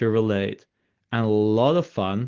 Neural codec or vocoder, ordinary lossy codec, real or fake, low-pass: none; Opus, 16 kbps; real; 7.2 kHz